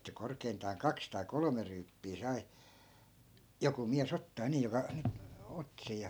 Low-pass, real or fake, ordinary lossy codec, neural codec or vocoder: none; real; none; none